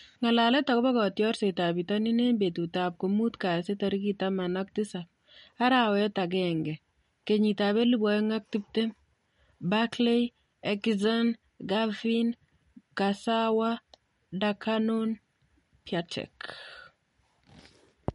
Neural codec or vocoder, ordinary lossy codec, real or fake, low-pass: none; MP3, 48 kbps; real; 19.8 kHz